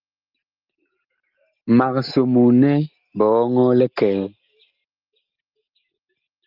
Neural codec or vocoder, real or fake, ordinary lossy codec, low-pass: none; real; Opus, 32 kbps; 5.4 kHz